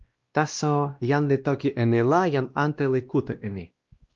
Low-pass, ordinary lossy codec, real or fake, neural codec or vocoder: 7.2 kHz; Opus, 32 kbps; fake; codec, 16 kHz, 1 kbps, X-Codec, WavLM features, trained on Multilingual LibriSpeech